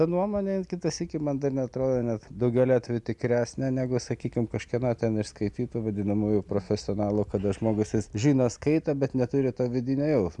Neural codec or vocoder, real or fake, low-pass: none; real; 10.8 kHz